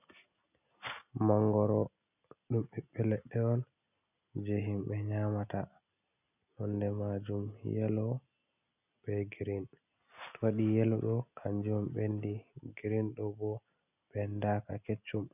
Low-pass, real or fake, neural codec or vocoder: 3.6 kHz; real; none